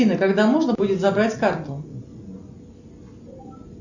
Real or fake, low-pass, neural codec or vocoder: real; 7.2 kHz; none